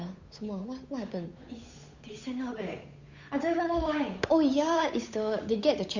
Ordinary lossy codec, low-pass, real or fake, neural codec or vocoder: Opus, 64 kbps; 7.2 kHz; fake; codec, 16 kHz, 8 kbps, FunCodec, trained on Chinese and English, 25 frames a second